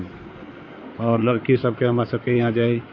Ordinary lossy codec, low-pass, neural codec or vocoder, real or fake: none; 7.2 kHz; codec, 16 kHz in and 24 kHz out, 2.2 kbps, FireRedTTS-2 codec; fake